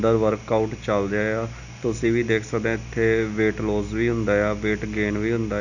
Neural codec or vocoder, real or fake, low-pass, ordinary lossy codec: none; real; 7.2 kHz; none